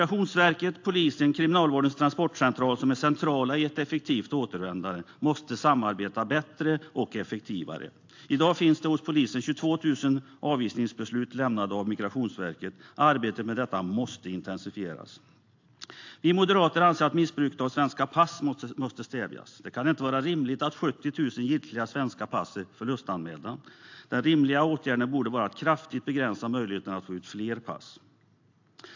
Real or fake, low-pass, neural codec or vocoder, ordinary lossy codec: real; 7.2 kHz; none; AAC, 48 kbps